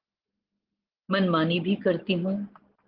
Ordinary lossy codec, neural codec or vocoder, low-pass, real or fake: Opus, 16 kbps; none; 5.4 kHz; real